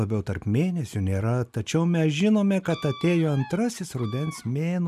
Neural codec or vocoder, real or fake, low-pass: none; real; 14.4 kHz